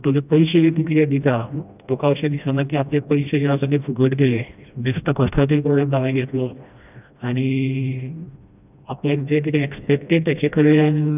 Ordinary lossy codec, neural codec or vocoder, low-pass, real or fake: none; codec, 16 kHz, 1 kbps, FreqCodec, smaller model; 3.6 kHz; fake